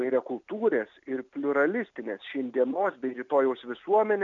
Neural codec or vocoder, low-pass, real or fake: none; 7.2 kHz; real